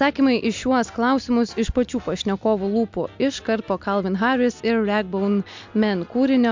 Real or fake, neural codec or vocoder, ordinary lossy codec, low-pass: real; none; MP3, 64 kbps; 7.2 kHz